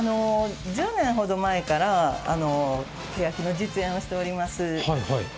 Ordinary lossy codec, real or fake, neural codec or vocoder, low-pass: none; real; none; none